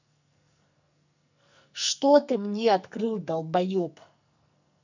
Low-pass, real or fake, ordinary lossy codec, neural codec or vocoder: 7.2 kHz; fake; none; codec, 44.1 kHz, 2.6 kbps, SNAC